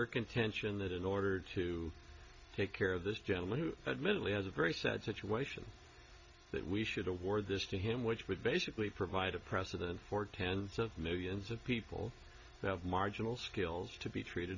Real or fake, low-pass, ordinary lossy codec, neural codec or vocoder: real; 7.2 kHz; Opus, 64 kbps; none